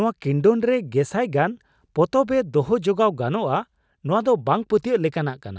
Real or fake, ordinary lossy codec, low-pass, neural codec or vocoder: real; none; none; none